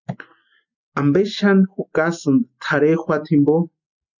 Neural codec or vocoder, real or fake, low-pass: none; real; 7.2 kHz